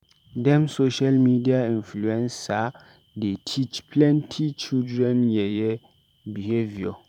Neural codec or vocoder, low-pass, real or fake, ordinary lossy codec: vocoder, 44.1 kHz, 128 mel bands every 512 samples, BigVGAN v2; 19.8 kHz; fake; none